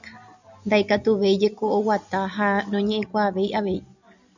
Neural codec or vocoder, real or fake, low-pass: none; real; 7.2 kHz